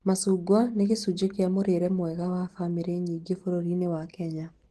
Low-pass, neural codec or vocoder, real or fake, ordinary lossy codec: 10.8 kHz; none; real; Opus, 16 kbps